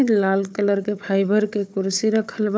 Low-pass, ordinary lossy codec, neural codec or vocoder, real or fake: none; none; codec, 16 kHz, 4 kbps, FunCodec, trained on Chinese and English, 50 frames a second; fake